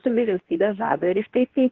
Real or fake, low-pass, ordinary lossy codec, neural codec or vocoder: fake; 7.2 kHz; Opus, 16 kbps; codec, 24 kHz, 0.9 kbps, WavTokenizer, medium speech release version 2